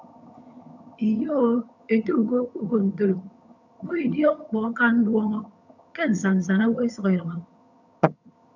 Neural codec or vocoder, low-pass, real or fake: vocoder, 22.05 kHz, 80 mel bands, HiFi-GAN; 7.2 kHz; fake